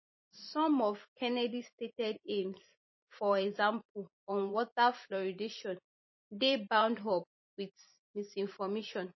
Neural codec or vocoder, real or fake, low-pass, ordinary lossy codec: vocoder, 44.1 kHz, 128 mel bands every 512 samples, BigVGAN v2; fake; 7.2 kHz; MP3, 24 kbps